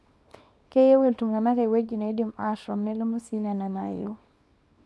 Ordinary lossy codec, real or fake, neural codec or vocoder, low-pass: none; fake; codec, 24 kHz, 0.9 kbps, WavTokenizer, small release; none